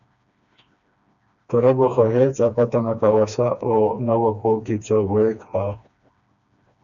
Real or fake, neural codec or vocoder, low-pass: fake; codec, 16 kHz, 2 kbps, FreqCodec, smaller model; 7.2 kHz